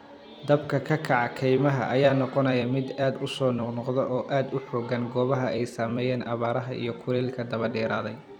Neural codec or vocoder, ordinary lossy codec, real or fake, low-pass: vocoder, 44.1 kHz, 128 mel bands every 256 samples, BigVGAN v2; none; fake; 19.8 kHz